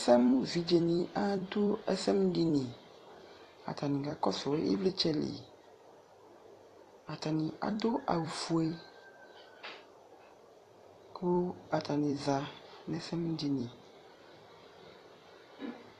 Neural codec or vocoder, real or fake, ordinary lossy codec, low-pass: vocoder, 44.1 kHz, 128 mel bands every 256 samples, BigVGAN v2; fake; AAC, 48 kbps; 14.4 kHz